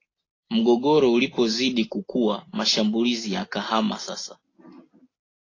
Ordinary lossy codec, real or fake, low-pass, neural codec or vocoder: AAC, 32 kbps; real; 7.2 kHz; none